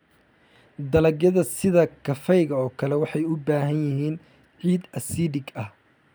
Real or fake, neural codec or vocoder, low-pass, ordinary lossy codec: real; none; none; none